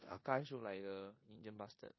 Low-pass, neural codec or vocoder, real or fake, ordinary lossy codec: 7.2 kHz; codec, 16 kHz in and 24 kHz out, 0.9 kbps, LongCat-Audio-Codec, four codebook decoder; fake; MP3, 24 kbps